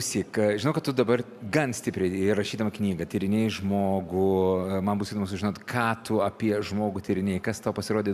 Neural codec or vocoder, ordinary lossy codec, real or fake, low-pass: none; AAC, 96 kbps; real; 14.4 kHz